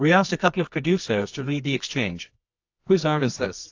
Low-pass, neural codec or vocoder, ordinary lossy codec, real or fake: 7.2 kHz; codec, 24 kHz, 0.9 kbps, WavTokenizer, medium music audio release; AAC, 48 kbps; fake